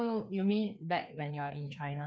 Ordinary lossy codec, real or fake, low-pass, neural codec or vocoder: none; fake; none; codec, 16 kHz, 2 kbps, FreqCodec, larger model